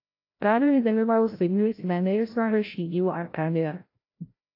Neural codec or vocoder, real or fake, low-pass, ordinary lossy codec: codec, 16 kHz, 0.5 kbps, FreqCodec, larger model; fake; 5.4 kHz; AAC, 32 kbps